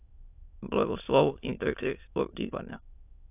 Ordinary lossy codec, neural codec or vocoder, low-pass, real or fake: AAC, 32 kbps; autoencoder, 22.05 kHz, a latent of 192 numbers a frame, VITS, trained on many speakers; 3.6 kHz; fake